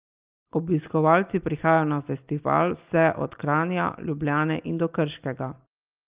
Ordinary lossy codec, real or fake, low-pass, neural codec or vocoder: Opus, 32 kbps; real; 3.6 kHz; none